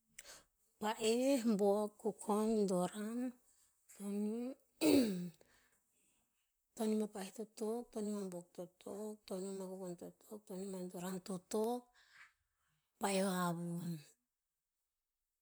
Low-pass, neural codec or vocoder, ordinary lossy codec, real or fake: none; vocoder, 44.1 kHz, 128 mel bands, Pupu-Vocoder; none; fake